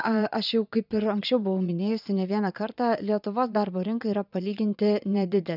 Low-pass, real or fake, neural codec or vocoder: 5.4 kHz; fake; vocoder, 22.05 kHz, 80 mel bands, Vocos